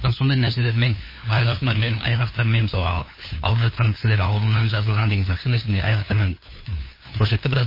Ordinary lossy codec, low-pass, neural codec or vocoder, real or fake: MP3, 24 kbps; 5.4 kHz; codec, 16 kHz, 2 kbps, FunCodec, trained on LibriTTS, 25 frames a second; fake